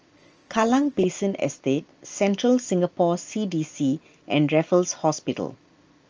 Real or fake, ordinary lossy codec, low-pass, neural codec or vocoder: real; Opus, 24 kbps; 7.2 kHz; none